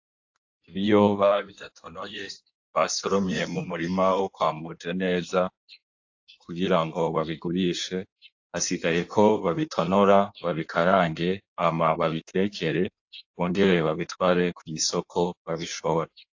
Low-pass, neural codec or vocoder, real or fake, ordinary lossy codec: 7.2 kHz; codec, 16 kHz in and 24 kHz out, 1.1 kbps, FireRedTTS-2 codec; fake; AAC, 48 kbps